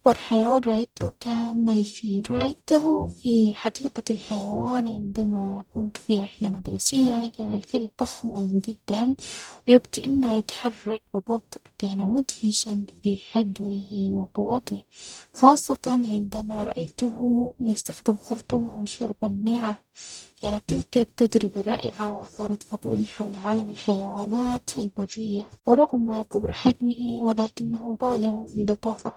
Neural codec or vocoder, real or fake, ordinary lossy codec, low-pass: codec, 44.1 kHz, 0.9 kbps, DAC; fake; none; 19.8 kHz